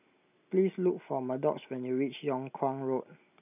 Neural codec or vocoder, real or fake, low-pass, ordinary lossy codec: none; real; 3.6 kHz; none